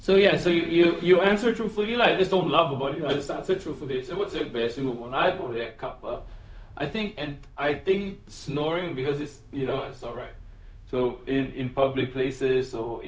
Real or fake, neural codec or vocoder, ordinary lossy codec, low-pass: fake; codec, 16 kHz, 0.4 kbps, LongCat-Audio-Codec; none; none